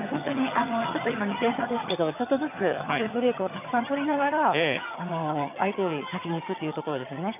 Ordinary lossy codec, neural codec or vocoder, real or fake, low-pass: none; vocoder, 22.05 kHz, 80 mel bands, HiFi-GAN; fake; 3.6 kHz